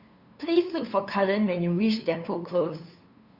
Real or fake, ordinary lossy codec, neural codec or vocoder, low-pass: fake; Opus, 64 kbps; codec, 16 kHz, 2 kbps, FunCodec, trained on LibriTTS, 25 frames a second; 5.4 kHz